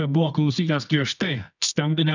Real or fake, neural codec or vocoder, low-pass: fake; codec, 24 kHz, 0.9 kbps, WavTokenizer, medium music audio release; 7.2 kHz